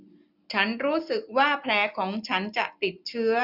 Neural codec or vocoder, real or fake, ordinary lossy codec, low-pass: none; real; none; 5.4 kHz